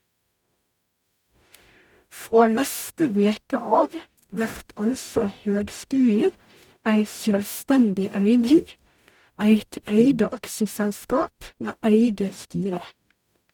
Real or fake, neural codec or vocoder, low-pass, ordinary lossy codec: fake; codec, 44.1 kHz, 0.9 kbps, DAC; 19.8 kHz; none